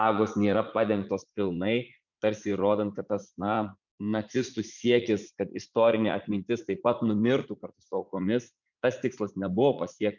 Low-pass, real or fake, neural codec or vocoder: 7.2 kHz; fake; vocoder, 44.1 kHz, 80 mel bands, Vocos